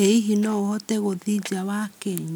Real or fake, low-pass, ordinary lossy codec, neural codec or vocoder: real; none; none; none